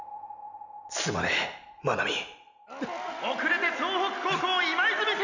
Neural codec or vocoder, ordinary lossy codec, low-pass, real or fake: none; none; 7.2 kHz; real